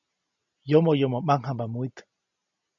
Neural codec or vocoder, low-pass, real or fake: none; 7.2 kHz; real